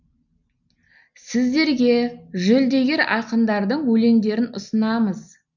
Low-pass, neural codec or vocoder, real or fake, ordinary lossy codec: 7.2 kHz; none; real; none